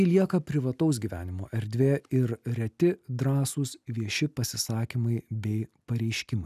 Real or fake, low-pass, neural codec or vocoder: real; 14.4 kHz; none